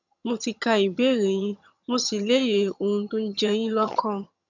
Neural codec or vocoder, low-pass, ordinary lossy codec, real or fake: vocoder, 22.05 kHz, 80 mel bands, HiFi-GAN; 7.2 kHz; none; fake